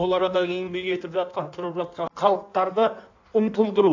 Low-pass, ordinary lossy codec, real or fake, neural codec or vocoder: 7.2 kHz; AAC, 48 kbps; fake; codec, 16 kHz in and 24 kHz out, 1.1 kbps, FireRedTTS-2 codec